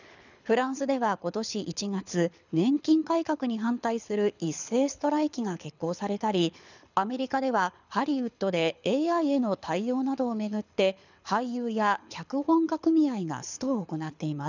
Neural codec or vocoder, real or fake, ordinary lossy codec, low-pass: codec, 24 kHz, 6 kbps, HILCodec; fake; none; 7.2 kHz